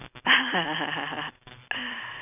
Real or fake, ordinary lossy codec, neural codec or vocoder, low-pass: real; none; none; 3.6 kHz